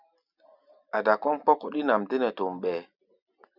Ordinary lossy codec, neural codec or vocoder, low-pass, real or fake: Opus, 64 kbps; none; 5.4 kHz; real